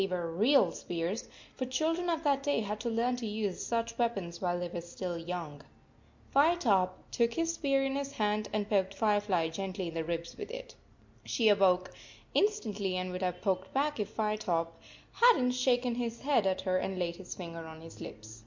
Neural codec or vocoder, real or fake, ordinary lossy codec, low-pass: none; real; MP3, 48 kbps; 7.2 kHz